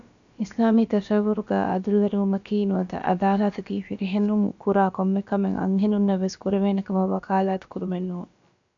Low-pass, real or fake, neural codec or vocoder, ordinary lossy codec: 7.2 kHz; fake; codec, 16 kHz, about 1 kbps, DyCAST, with the encoder's durations; AAC, 64 kbps